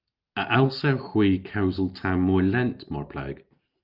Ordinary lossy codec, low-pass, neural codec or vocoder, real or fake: Opus, 24 kbps; 5.4 kHz; none; real